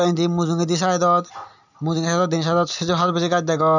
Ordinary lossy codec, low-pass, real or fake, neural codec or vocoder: none; 7.2 kHz; real; none